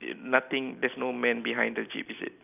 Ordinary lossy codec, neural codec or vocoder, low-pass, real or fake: none; none; 3.6 kHz; real